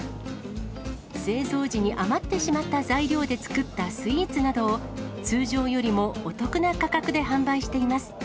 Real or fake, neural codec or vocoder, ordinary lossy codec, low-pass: real; none; none; none